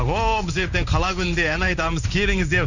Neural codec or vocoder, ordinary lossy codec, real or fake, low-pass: codec, 16 kHz in and 24 kHz out, 1 kbps, XY-Tokenizer; none; fake; 7.2 kHz